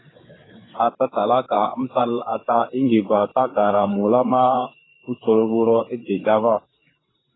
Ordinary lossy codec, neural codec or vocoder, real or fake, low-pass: AAC, 16 kbps; codec, 16 kHz, 4 kbps, FreqCodec, larger model; fake; 7.2 kHz